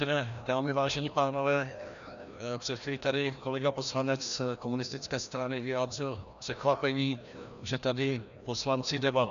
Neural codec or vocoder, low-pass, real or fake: codec, 16 kHz, 1 kbps, FreqCodec, larger model; 7.2 kHz; fake